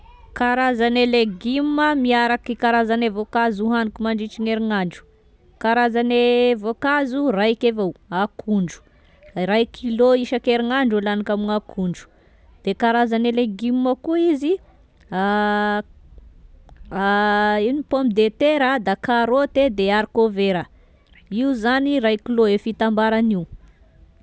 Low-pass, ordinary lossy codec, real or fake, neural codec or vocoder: none; none; real; none